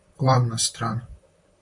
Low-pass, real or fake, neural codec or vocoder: 10.8 kHz; fake; vocoder, 44.1 kHz, 128 mel bands, Pupu-Vocoder